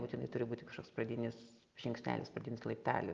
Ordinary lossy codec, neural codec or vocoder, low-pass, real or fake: Opus, 32 kbps; none; 7.2 kHz; real